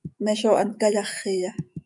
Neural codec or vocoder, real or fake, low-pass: autoencoder, 48 kHz, 128 numbers a frame, DAC-VAE, trained on Japanese speech; fake; 10.8 kHz